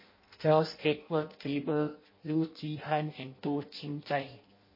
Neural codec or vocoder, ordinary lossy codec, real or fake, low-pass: codec, 16 kHz in and 24 kHz out, 0.6 kbps, FireRedTTS-2 codec; MP3, 24 kbps; fake; 5.4 kHz